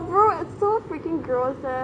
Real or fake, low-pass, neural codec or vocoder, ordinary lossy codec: real; 9.9 kHz; none; AAC, 48 kbps